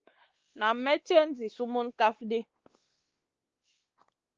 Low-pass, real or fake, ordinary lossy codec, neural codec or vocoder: 7.2 kHz; fake; Opus, 16 kbps; codec, 16 kHz, 2 kbps, X-Codec, WavLM features, trained on Multilingual LibriSpeech